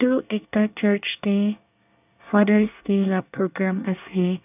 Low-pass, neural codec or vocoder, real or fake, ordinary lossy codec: 3.6 kHz; codec, 24 kHz, 1 kbps, SNAC; fake; none